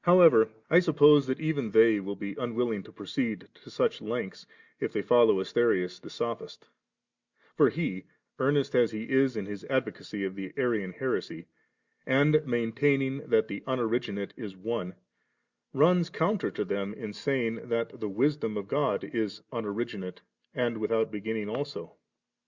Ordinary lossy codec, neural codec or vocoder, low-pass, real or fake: Opus, 64 kbps; none; 7.2 kHz; real